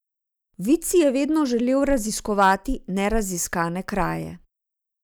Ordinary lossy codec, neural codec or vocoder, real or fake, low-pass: none; none; real; none